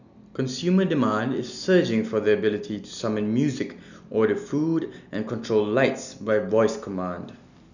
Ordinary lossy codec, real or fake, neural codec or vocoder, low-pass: none; real; none; 7.2 kHz